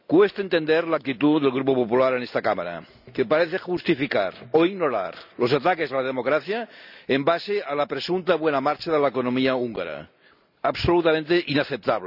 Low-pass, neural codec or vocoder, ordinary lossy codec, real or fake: 5.4 kHz; none; none; real